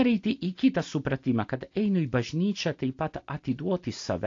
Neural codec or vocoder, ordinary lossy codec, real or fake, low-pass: none; AAC, 48 kbps; real; 7.2 kHz